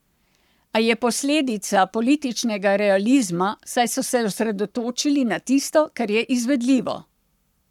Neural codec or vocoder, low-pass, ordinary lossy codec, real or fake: codec, 44.1 kHz, 7.8 kbps, Pupu-Codec; 19.8 kHz; none; fake